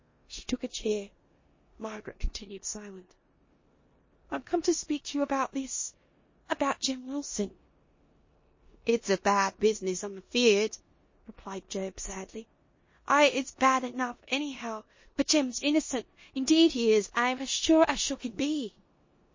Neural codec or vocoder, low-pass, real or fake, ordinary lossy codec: codec, 16 kHz in and 24 kHz out, 0.9 kbps, LongCat-Audio-Codec, four codebook decoder; 7.2 kHz; fake; MP3, 32 kbps